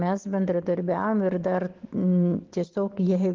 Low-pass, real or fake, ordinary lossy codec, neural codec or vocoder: 7.2 kHz; real; Opus, 16 kbps; none